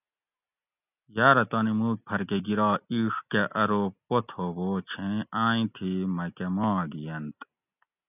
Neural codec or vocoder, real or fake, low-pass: none; real; 3.6 kHz